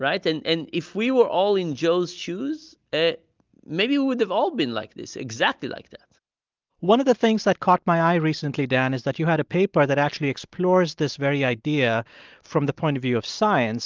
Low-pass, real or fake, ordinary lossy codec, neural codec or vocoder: 7.2 kHz; real; Opus, 24 kbps; none